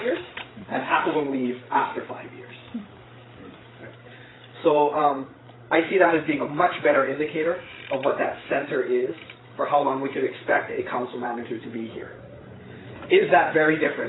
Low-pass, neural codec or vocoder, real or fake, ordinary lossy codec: 7.2 kHz; codec, 16 kHz, 8 kbps, FreqCodec, larger model; fake; AAC, 16 kbps